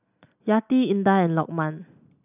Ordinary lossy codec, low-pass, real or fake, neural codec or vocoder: none; 3.6 kHz; real; none